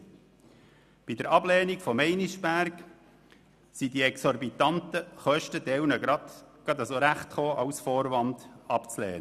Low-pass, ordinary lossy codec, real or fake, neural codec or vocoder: 14.4 kHz; none; real; none